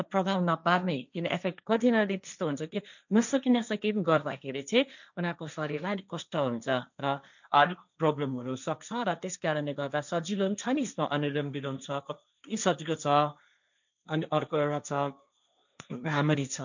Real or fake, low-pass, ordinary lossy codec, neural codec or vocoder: fake; 7.2 kHz; none; codec, 16 kHz, 1.1 kbps, Voila-Tokenizer